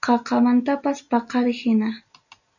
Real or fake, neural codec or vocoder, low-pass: real; none; 7.2 kHz